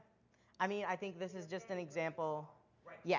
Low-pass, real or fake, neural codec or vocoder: 7.2 kHz; real; none